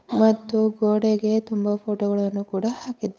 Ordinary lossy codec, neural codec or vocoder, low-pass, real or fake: none; codec, 16 kHz, 8 kbps, FunCodec, trained on Chinese and English, 25 frames a second; none; fake